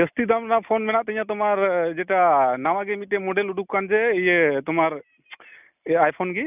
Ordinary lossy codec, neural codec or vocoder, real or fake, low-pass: none; none; real; 3.6 kHz